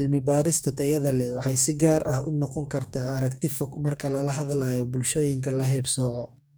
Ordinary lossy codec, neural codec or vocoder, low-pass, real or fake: none; codec, 44.1 kHz, 2.6 kbps, DAC; none; fake